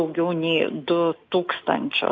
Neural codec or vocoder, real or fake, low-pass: none; real; 7.2 kHz